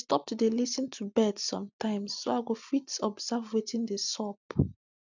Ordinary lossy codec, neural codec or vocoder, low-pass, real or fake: none; none; 7.2 kHz; real